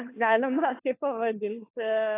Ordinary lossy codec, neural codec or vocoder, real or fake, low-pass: AAC, 16 kbps; codec, 16 kHz, 4 kbps, FunCodec, trained on LibriTTS, 50 frames a second; fake; 3.6 kHz